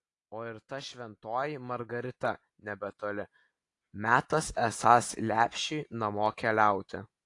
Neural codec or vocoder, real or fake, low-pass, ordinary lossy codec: none; real; 10.8 kHz; AAC, 48 kbps